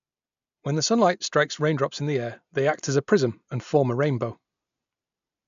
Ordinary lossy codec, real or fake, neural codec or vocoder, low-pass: MP3, 64 kbps; real; none; 7.2 kHz